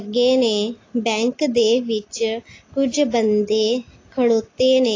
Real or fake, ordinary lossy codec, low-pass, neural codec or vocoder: real; AAC, 32 kbps; 7.2 kHz; none